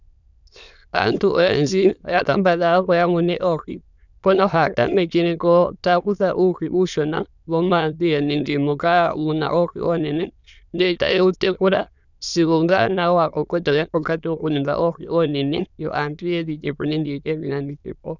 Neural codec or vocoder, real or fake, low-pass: autoencoder, 22.05 kHz, a latent of 192 numbers a frame, VITS, trained on many speakers; fake; 7.2 kHz